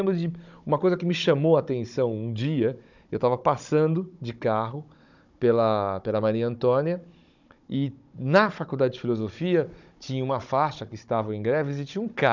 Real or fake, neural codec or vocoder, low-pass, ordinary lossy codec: fake; codec, 16 kHz, 16 kbps, FunCodec, trained on Chinese and English, 50 frames a second; 7.2 kHz; none